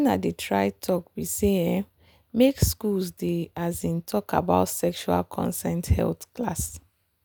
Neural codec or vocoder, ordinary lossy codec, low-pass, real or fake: none; none; none; real